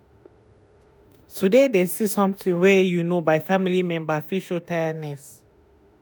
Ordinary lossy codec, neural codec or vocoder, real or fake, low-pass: none; autoencoder, 48 kHz, 32 numbers a frame, DAC-VAE, trained on Japanese speech; fake; none